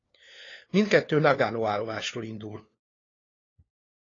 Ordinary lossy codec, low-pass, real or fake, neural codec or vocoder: AAC, 32 kbps; 7.2 kHz; fake; codec, 16 kHz, 4 kbps, FunCodec, trained on LibriTTS, 50 frames a second